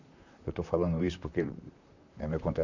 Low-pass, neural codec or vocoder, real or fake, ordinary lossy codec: 7.2 kHz; vocoder, 44.1 kHz, 128 mel bands, Pupu-Vocoder; fake; none